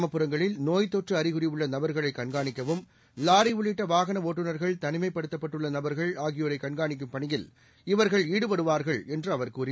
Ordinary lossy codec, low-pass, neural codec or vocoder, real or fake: none; none; none; real